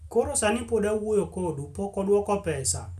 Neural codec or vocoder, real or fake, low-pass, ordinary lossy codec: none; real; 14.4 kHz; none